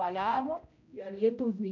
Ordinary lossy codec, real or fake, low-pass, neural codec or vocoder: none; fake; 7.2 kHz; codec, 16 kHz, 0.5 kbps, X-Codec, HuBERT features, trained on general audio